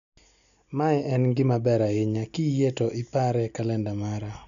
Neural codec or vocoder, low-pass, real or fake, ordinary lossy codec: none; 7.2 kHz; real; none